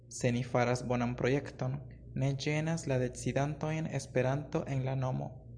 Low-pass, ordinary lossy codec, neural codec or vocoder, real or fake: 9.9 kHz; Opus, 64 kbps; none; real